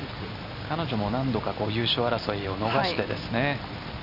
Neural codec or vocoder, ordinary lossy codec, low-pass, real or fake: none; none; 5.4 kHz; real